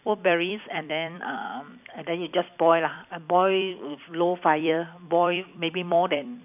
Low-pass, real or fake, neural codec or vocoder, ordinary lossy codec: 3.6 kHz; fake; vocoder, 44.1 kHz, 128 mel bands every 256 samples, BigVGAN v2; none